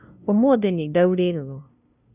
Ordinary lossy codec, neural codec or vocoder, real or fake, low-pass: none; codec, 16 kHz, 0.5 kbps, FunCodec, trained on LibriTTS, 25 frames a second; fake; 3.6 kHz